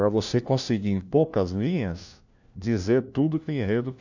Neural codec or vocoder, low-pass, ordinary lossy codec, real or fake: codec, 16 kHz, 1 kbps, FunCodec, trained on LibriTTS, 50 frames a second; 7.2 kHz; none; fake